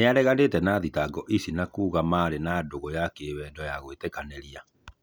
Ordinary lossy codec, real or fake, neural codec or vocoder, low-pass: none; real; none; none